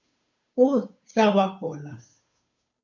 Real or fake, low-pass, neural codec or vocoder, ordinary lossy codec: fake; 7.2 kHz; codec, 16 kHz, 2 kbps, FunCodec, trained on Chinese and English, 25 frames a second; MP3, 48 kbps